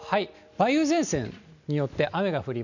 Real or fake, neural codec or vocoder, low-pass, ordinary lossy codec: real; none; 7.2 kHz; none